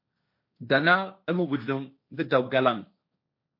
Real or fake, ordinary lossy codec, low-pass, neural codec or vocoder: fake; MP3, 32 kbps; 5.4 kHz; codec, 16 kHz, 1.1 kbps, Voila-Tokenizer